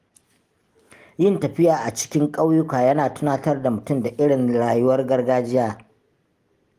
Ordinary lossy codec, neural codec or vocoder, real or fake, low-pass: Opus, 24 kbps; none; real; 14.4 kHz